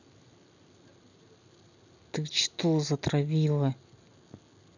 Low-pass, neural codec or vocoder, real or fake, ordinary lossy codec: 7.2 kHz; none; real; none